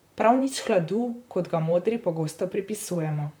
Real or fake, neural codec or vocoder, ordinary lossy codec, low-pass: fake; vocoder, 44.1 kHz, 128 mel bands, Pupu-Vocoder; none; none